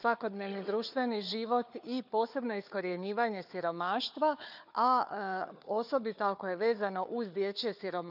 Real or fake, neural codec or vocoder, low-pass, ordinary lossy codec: fake; codec, 16 kHz, 4 kbps, FunCodec, trained on Chinese and English, 50 frames a second; 5.4 kHz; none